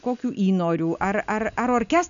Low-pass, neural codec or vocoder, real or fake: 7.2 kHz; none; real